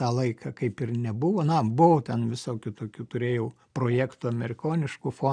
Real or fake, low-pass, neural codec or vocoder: real; 9.9 kHz; none